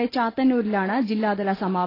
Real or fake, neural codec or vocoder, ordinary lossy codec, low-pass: real; none; AAC, 24 kbps; 5.4 kHz